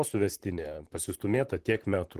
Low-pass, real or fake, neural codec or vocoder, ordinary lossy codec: 14.4 kHz; fake; vocoder, 44.1 kHz, 128 mel bands, Pupu-Vocoder; Opus, 16 kbps